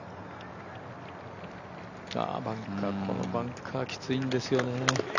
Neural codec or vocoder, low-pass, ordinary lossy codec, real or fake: none; 7.2 kHz; none; real